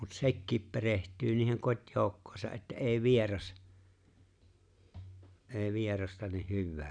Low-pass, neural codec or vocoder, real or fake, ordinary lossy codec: 9.9 kHz; none; real; none